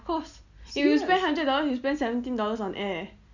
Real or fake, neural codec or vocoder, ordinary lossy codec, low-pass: real; none; none; 7.2 kHz